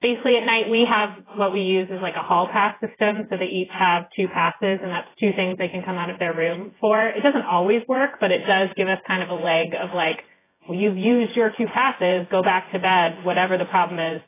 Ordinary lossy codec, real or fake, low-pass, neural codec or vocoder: AAC, 16 kbps; fake; 3.6 kHz; vocoder, 24 kHz, 100 mel bands, Vocos